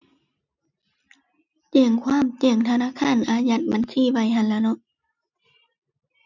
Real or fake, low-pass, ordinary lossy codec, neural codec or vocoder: real; 7.2 kHz; none; none